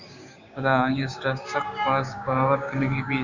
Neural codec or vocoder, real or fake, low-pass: codec, 16 kHz, 6 kbps, DAC; fake; 7.2 kHz